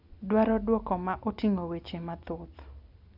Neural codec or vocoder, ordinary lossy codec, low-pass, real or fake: none; none; 5.4 kHz; real